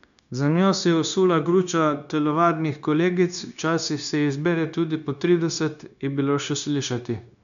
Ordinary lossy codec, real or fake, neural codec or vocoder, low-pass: none; fake; codec, 16 kHz, 0.9 kbps, LongCat-Audio-Codec; 7.2 kHz